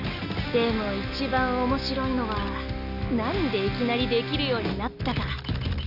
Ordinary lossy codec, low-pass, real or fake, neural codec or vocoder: none; 5.4 kHz; real; none